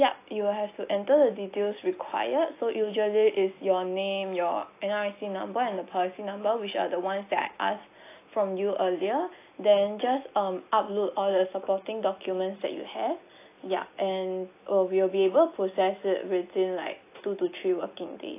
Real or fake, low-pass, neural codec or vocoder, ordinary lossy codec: real; 3.6 kHz; none; AAC, 24 kbps